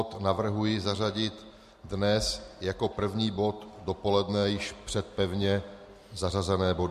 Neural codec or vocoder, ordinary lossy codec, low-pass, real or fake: none; MP3, 64 kbps; 14.4 kHz; real